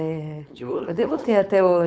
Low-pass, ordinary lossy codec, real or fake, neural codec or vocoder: none; none; fake; codec, 16 kHz, 4.8 kbps, FACodec